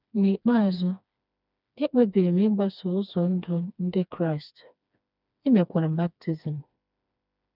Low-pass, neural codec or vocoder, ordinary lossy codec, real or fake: 5.4 kHz; codec, 16 kHz, 2 kbps, FreqCodec, smaller model; none; fake